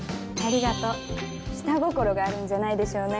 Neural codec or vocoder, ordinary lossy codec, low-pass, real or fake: none; none; none; real